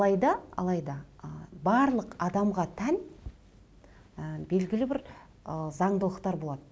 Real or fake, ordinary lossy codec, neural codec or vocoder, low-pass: real; none; none; none